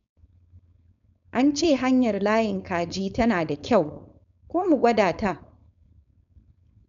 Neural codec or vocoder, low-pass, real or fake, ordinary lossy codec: codec, 16 kHz, 4.8 kbps, FACodec; 7.2 kHz; fake; none